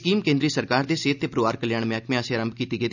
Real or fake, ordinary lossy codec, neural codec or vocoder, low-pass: real; none; none; 7.2 kHz